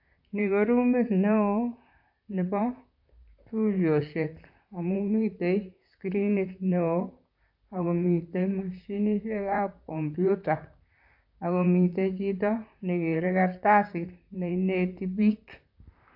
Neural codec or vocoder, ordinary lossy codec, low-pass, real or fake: vocoder, 44.1 kHz, 128 mel bands, Pupu-Vocoder; AAC, 48 kbps; 5.4 kHz; fake